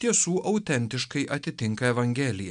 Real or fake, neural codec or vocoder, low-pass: real; none; 9.9 kHz